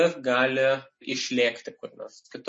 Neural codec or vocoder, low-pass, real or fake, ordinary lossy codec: none; 10.8 kHz; real; MP3, 32 kbps